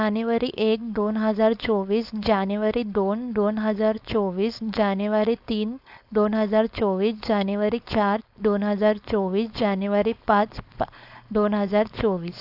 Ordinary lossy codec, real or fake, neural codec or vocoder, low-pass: AAC, 48 kbps; fake; codec, 16 kHz, 4.8 kbps, FACodec; 5.4 kHz